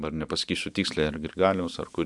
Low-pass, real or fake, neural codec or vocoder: 10.8 kHz; real; none